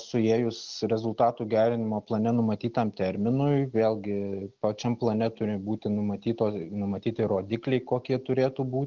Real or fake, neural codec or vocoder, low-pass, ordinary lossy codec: real; none; 7.2 kHz; Opus, 24 kbps